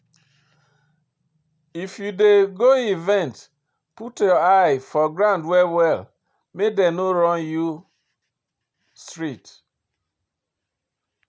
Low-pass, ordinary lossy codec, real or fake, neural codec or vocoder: none; none; real; none